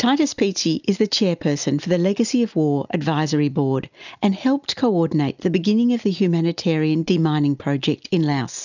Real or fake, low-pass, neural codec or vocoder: real; 7.2 kHz; none